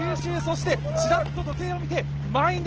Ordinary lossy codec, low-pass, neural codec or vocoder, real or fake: Opus, 16 kbps; 7.2 kHz; none; real